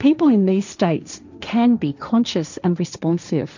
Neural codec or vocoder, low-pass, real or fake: codec, 16 kHz, 1.1 kbps, Voila-Tokenizer; 7.2 kHz; fake